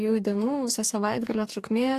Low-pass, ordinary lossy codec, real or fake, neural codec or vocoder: 14.4 kHz; AAC, 64 kbps; fake; codec, 44.1 kHz, 2.6 kbps, DAC